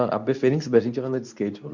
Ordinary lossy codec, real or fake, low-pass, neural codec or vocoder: none; fake; 7.2 kHz; codec, 24 kHz, 0.9 kbps, WavTokenizer, medium speech release version 2